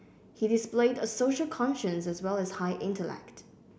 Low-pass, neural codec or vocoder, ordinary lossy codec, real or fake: none; none; none; real